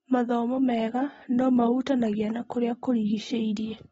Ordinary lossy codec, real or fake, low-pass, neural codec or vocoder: AAC, 24 kbps; real; 19.8 kHz; none